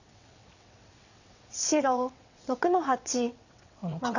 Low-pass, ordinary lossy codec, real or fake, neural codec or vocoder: 7.2 kHz; none; fake; vocoder, 22.05 kHz, 80 mel bands, WaveNeXt